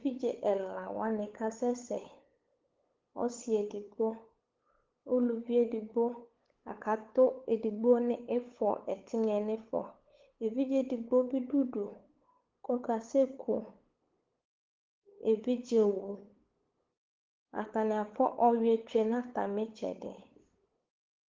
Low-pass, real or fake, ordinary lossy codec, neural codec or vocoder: 7.2 kHz; fake; Opus, 16 kbps; codec, 16 kHz, 8 kbps, FunCodec, trained on LibriTTS, 25 frames a second